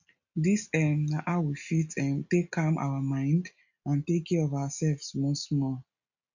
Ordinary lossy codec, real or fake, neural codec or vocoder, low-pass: none; real; none; 7.2 kHz